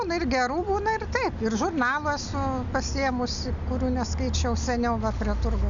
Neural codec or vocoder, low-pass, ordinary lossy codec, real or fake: none; 7.2 kHz; MP3, 96 kbps; real